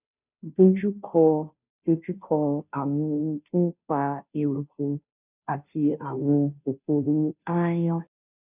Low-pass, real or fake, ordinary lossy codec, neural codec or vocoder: 3.6 kHz; fake; none; codec, 16 kHz, 0.5 kbps, FunCodec, trained on Chinese and English, 25 frames a second